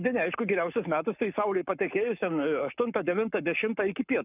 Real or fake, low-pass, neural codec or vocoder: fake; 3.6 kHz; codec, 44.1 kHz, 7.8 kbps, Pupu-Codec